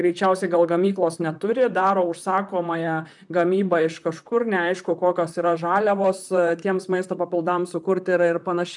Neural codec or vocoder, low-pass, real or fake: vocoder, 44.1 kHz, 128 mel bands, Pupu-Vocoder; 10.8 kHz; fake